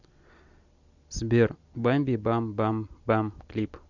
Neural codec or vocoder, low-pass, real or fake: none; 7.2 kHz; real